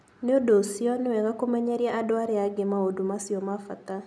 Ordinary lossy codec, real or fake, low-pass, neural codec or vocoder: none; real; none; none